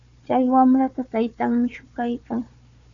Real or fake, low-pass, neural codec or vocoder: fake; 7.2 kHz; codec, 16 kHz, 4 kbps, FunCodec, trained on Chinese and English, 50 frames a second